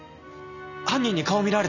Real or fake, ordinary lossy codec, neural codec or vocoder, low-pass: real; none; none; 7.2 kHz